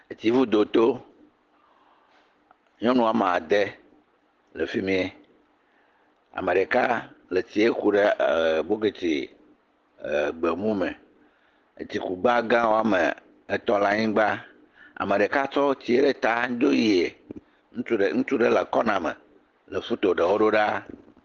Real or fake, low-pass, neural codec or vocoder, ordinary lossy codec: real; 7.2 kHz; none; Opus, 16 kbps